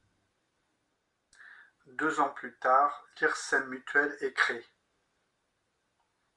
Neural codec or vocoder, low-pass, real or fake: none; 10.8 kHz; real